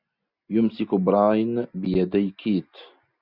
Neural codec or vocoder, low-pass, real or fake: none; 5.4 kHz; real